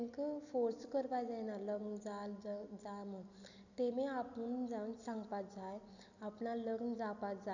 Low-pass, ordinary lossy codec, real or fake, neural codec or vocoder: 7.2 kHz; none; real; none